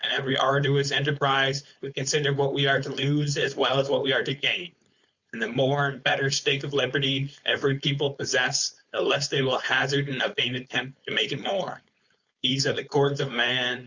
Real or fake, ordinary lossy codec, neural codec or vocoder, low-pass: fake; Opus, 64 kbps; codec, 16 kHz, 4.8 kbps, FACodec; 7.2 kHz